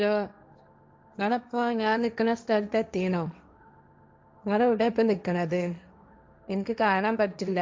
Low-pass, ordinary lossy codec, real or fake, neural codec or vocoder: none; none; fake; codec, 16 kHz, 1.1 kbps, Voila-Tokenizer